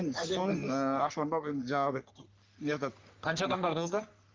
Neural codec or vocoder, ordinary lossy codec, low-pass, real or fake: codec, 44.1 kHz, 3.4 kbps, Pupu-Codec; Opus, 24 kbps; 7.2 kHz; fake